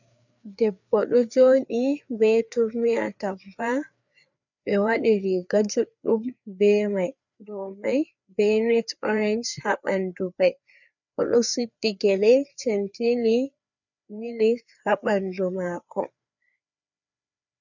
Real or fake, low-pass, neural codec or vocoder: fake; 7.2 kHz; codec, 16 kHz, 4 kbps, FreqCodec, larger model